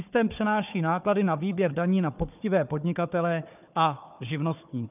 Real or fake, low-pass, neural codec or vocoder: fake; 3.6 kHz; codec, 16 kHz, 4 kbps, FunCodec, trained on Chinese and English, 50 frames a second